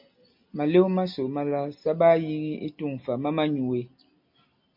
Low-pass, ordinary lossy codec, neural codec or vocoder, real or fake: 5.4 kHz; MP3, 48 kbps; none; real